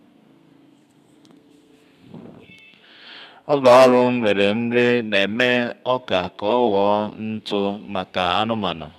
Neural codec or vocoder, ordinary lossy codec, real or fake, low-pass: codec, 32 kHz, 1.9 kbps, SNAC; none; fake; 14.4 kHz